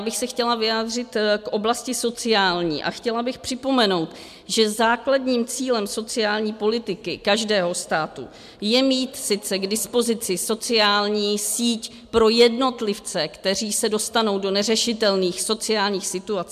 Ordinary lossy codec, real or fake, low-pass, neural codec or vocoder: MP3, 96 kbps; real; 14.4 kHz; none